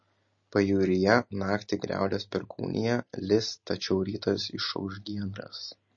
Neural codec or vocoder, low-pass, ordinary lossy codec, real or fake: none; 7.2 kHz; MP3, 32 kbps; real